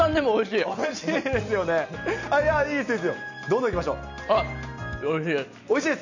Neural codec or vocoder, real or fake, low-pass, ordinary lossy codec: none; real; 7.2 kHz; none